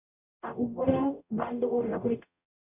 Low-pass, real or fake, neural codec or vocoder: 3.6 kHz; fake; codec, 44.1 kHz, 0.9 kbps, DAC